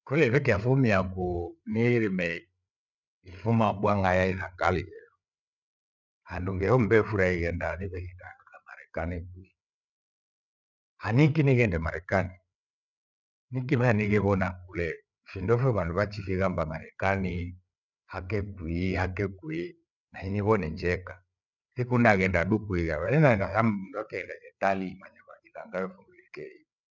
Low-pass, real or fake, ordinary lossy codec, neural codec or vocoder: 7.2 kHz; fake; none; codec, 16 kHz, 8 kbps, FreqCodec, larger model